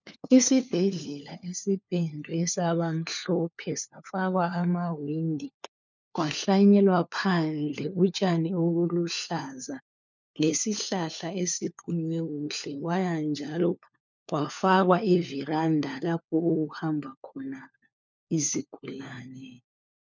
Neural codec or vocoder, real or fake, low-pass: codec, 16 kHz, 4 kbps, FunCodec, trained on LibriTTS, 50 frames a second; fake; 7.2 kHz